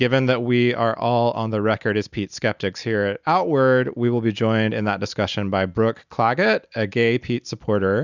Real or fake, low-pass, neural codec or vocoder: real; 7.2 kHz; none